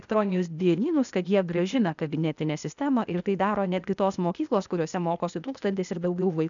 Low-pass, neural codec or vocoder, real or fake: 7.2 kHz; codec, 16 kHz, 0.8 kbps, ZipCodec; fake